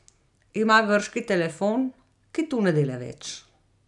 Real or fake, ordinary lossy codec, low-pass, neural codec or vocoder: real; none; 10.8 kHz; none